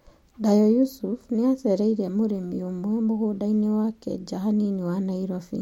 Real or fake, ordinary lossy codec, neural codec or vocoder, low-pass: real; MP3, 64 kbps; none; 19.8 kHz